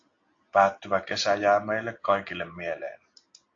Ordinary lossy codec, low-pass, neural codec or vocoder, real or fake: AAC, 48 kbps; 7.2 kHz; none; real